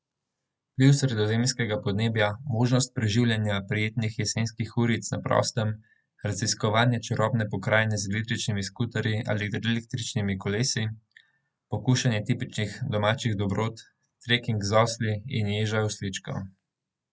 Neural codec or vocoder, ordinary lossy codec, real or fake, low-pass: none; none; real; none